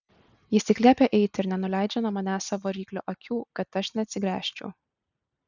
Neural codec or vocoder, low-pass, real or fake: none; 7.2 kHz; real